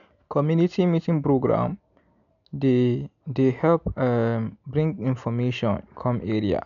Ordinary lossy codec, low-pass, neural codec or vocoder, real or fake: none; 7.2 kHz; none; real